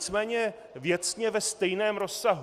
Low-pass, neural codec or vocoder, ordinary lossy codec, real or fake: 14.4 kHz; none; Opus, 64 kbps; real